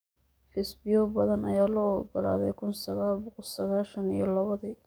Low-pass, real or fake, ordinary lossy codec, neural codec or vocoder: none; fake; none; codec, 44.1 kHz, 7.8 kbps, DAC